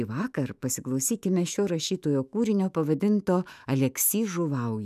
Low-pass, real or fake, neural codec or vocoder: 14.4 kHz; fake; autoencoder, 48 kHz, 128 numbers a frame, DAC-VAE, trained on Japanese speech